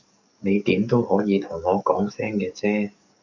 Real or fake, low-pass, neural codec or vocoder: fake; 7.2 kHz; codec, 44.1 kHz, 7.8 kbps, DAC